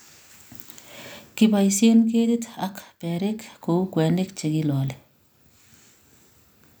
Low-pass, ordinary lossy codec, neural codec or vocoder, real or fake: none; none; none; real